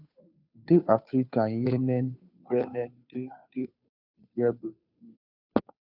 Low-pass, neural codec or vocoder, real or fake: 5.4 kHz; codec, 16 kHz, 2 kbps, FunCodec, trained on Chinese and English, 25 frames a second; fake